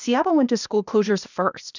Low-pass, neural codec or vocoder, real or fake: 7.2 kHz; codec, 16 kHz, 0.8 kbps, ZipCodec; fake